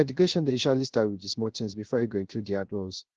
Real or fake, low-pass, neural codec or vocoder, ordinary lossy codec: fake; 7.2 kHz; codec, 16 kHz, 0.3 kbps, FocalCodec; Opus, 16 kbps